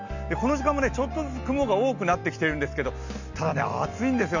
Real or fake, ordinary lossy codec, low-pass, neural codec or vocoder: real; none; 7.2 kHz; none